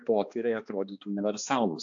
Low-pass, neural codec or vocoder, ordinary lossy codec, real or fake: 7.2 kHz; codec, 16 kHz, 2 kbps, X-Codec, HuBERT features, trained on balanced general audio; AAC, 64 kbps; fake